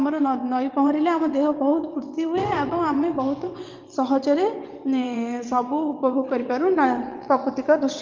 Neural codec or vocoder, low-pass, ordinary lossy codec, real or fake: vocoder, 22.05 kHz, 80 mel bands, WaveNeXt; 7.2 kHz; Opus, 32 kbps; fake